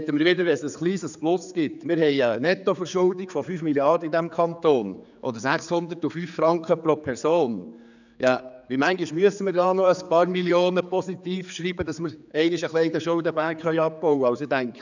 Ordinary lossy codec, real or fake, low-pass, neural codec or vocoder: none; fake; 7.2 kHz; codec, 16 kHz, 4 kbps, X-Codec, HuBERT features, trained on general audio